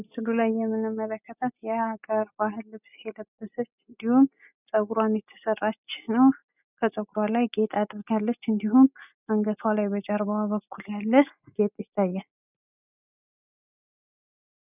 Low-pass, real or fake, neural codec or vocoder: 3.6 kHz; real; none